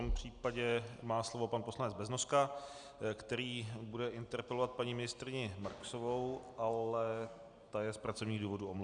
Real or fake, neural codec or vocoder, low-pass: real; none; 9.9 kHz